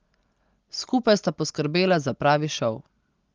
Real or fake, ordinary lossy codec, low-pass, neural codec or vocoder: real; Opus, 32 kbps; 7.2 kHz; none